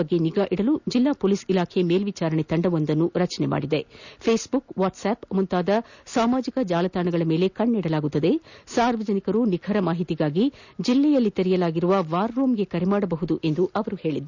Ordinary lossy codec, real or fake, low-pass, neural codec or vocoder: none; real; 7.2 kHz; none